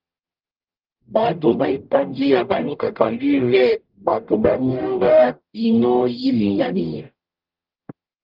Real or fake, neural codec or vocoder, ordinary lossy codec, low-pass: fake; codec, 44.1 kHz, 0.9 kbps, DAC; Opus, 32 kbps; 5.4 kHz